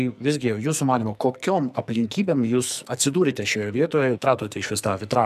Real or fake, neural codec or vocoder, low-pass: fake; codec, 44.1 kHz, 2.6 kbps, SNAC; 14.4 kHz